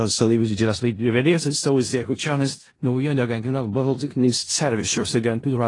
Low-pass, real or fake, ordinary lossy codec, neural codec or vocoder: 10.8 kHz; fake; AAC, 32 kbps; codec, 16 kHz in and 24 kHz out, 0.4 kbps, LongCat-Audio-Codec, four codebook decoder